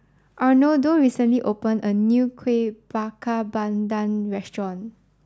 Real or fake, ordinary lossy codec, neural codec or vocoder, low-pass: real; none; none; none